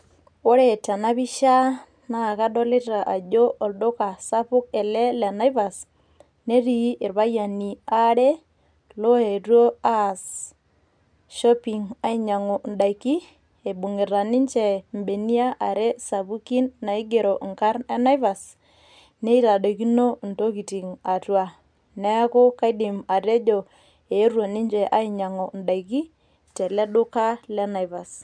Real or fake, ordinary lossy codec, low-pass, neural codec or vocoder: real; MP3, 96 kbps; 9.9 kHz; none